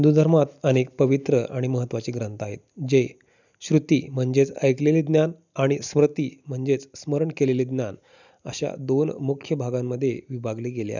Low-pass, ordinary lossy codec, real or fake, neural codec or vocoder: 7.2 kHz; none; real; none